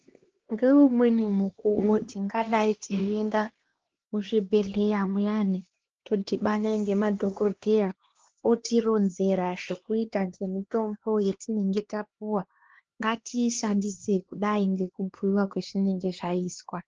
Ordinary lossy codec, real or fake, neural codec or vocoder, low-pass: Opus, 16 kbps; fake; codec, 16 kHz, 2 kbps, X-Codec, HuBERT features, trained on LibriSpeech; 7.2 kHz